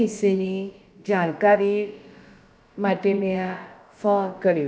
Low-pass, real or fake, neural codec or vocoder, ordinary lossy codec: none; fake; codec, 16 kHz, about 1 kbps, DyCAST, with the encoder's durations; none